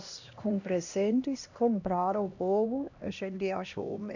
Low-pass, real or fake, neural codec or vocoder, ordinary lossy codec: 7.2 kHz; fake; codec, 16 kHz, 1 kbps, X-Codec, HuBERT features, trained on LibriSpeech; none